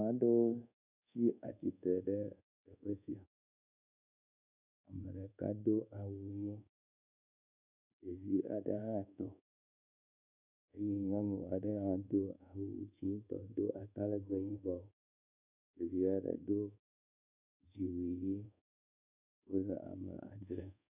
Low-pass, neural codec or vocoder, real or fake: 3.6 kHz; codec, 24 kHz, 1.2 kbps, DualCodec; fake